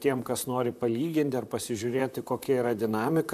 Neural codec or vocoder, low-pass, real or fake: vocoder, 44.1 kHz, 128 mel bands, Pupu-Vocoder; 14.4 kHz; fake